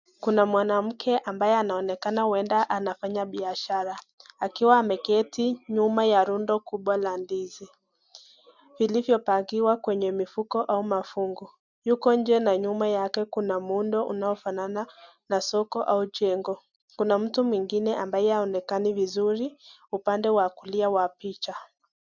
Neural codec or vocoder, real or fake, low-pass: none; real; 7.2 kHz